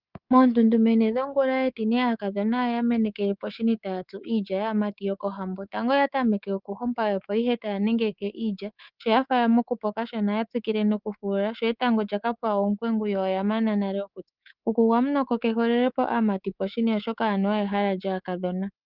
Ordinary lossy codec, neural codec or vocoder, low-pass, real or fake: Opus, 32 kbps; codec, 24 kHz, 3.1 kbps, DualCodec; 5.4 kHz; fake